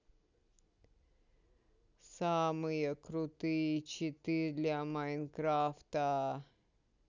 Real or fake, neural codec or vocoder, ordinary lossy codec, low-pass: real; none; none; 7.2 kHz